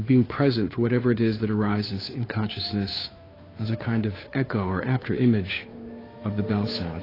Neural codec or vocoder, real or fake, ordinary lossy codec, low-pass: codec, 16 kHz, 2 kbps, FunCodec, trained on Chinese and English, 25 frames a second; fake; AAC, 24 kbps; 5.4 kHz